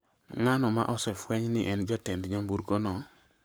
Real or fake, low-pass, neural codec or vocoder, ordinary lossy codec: fake; none; codec, 44.1 kHz, 7.8 kbps, Pupu-Codec; none